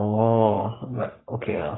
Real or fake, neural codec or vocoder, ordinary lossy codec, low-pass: fake; codec, 44.1 kHz, 3.4 kbps, Pupu-Codec; AAC, 16 kbps; 7.2 kHz